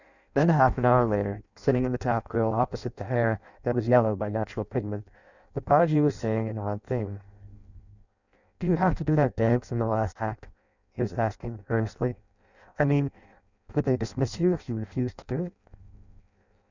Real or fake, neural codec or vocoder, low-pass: fake; codec, 16 kHz in and 24 kHz out, 0.6 kbps, FireRedTTS-2 codec; 7.2 kHz